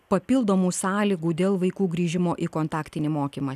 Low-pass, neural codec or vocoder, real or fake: 14.4 kHz; none; real